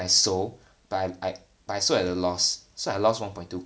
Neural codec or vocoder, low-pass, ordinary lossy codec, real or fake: none; none; none; real